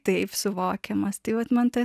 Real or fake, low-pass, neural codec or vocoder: real; 14.4 kHz; none